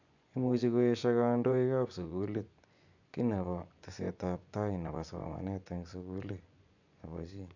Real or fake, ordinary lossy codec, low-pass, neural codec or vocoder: fake; none; 7.2 kHz; vocoder, 24 kHz, 100 mel bands, Vocos